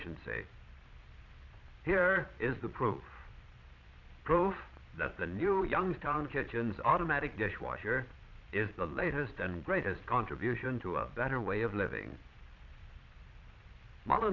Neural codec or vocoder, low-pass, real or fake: vocoder, 22.05 kHz, 80 mel bands, Vocos; 7.2 kHz; fake